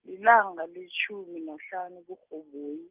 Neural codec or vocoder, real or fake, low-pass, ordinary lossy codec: none; real; 3.6 kHz; Opus, 32 kbps